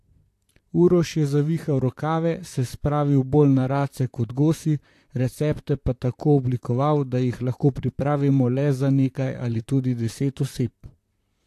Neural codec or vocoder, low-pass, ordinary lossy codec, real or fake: vocoder, 44.1 kHz, 128 mel bands, Pupu-Vocoder; 14.4 kHz; AAC, 64 kbps; fake